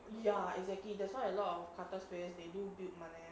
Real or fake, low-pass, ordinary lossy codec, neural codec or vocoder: real; none; none; none